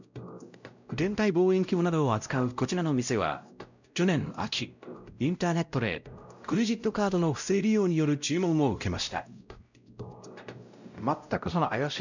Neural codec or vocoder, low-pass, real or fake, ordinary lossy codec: codec, 16 kHz, 0.5 kbps, X-Codec, WavLM features, trained on Multilingual LibriSpeech; 7.2 kHz; fake; none